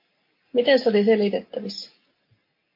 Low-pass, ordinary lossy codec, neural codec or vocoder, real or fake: 5.4 kHz; MP3, 32 kbps; none; real